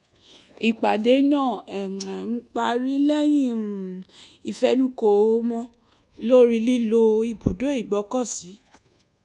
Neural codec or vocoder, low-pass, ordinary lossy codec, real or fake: codec, 24 kHz, 1.2 kbps, DualCodec; 10.8 kHz; none; fake